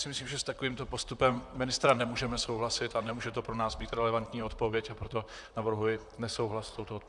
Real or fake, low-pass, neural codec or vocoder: fake; 10.8 kHz; vocoder, 44.1 kHz, 128 mel bands, Pupu-Vocoder